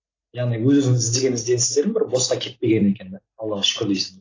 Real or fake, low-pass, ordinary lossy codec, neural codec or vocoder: real; 7.2 kHz; AAC, 32 kbps; none